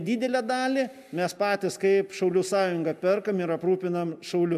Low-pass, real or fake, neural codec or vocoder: 14.4 kHz; real; none